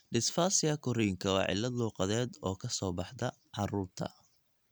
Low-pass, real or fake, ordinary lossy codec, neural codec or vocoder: none; real; none; none